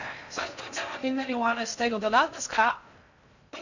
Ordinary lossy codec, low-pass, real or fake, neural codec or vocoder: none; 7.2 kHz; fake; codec, 16 kHz in and 24 kHz out, 0.6 kbps, FocalCodec, streaming, 2048 codes